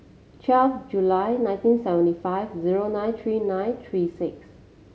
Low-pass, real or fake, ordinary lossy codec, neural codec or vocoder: none; real; none; none